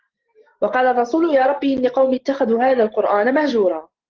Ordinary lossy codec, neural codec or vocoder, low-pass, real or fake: Opus, 16 kbps; none; 7.2 kHz; real